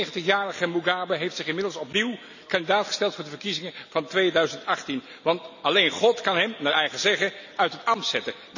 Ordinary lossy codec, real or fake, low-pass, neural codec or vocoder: none; real; 7.2 kHz; none